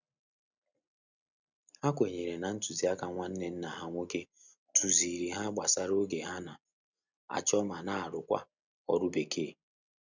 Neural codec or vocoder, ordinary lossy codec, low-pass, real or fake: none; none; 7.2 kHz; real